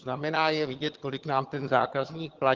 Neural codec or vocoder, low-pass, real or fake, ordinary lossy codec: vocoder, 22.05 kHz, 80 mel bands, HiFi-GAN; 7.2 kHz; fake; Opus, 16 kbps